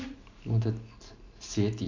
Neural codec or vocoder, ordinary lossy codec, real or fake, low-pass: none; none; real; 7.2 kHz